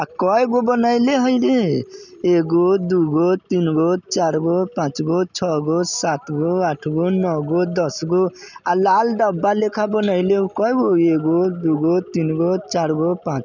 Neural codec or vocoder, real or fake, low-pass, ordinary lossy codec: none; real; 7.2 kHz; none